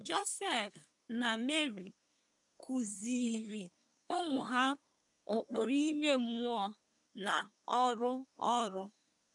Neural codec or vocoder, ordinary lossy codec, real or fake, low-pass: codec, 24 kHz, 1 kbps, SNAC; none; fake; 10.8 kHz